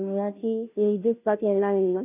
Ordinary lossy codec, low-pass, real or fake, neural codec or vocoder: none; 3.6 kHz; fake; codec, 16 kHz, 0.5 kbps, FunCodec, trained on Chinese and English, 25 frames a second